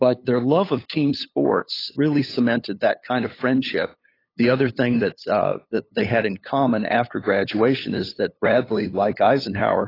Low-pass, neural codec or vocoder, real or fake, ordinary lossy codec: 5.4 kHz; codec, 16 kHz, 8 kbps, FunCodec, trained on LibriTTS, 25 frames a second; fake; AAC, 24 kbps